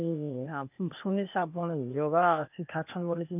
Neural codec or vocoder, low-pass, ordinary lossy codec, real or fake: codec, 16 kHz, 0.8 kbps, ZipCodec; 3.6 kHz; none; fake